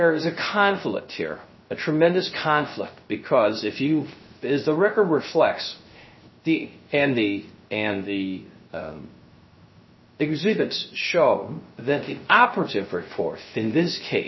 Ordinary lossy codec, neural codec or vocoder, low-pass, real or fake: MP3, 24 kbps; codec, 16 kHz, 0.3 kbps, FocalCodec; 7.2 kHz; fake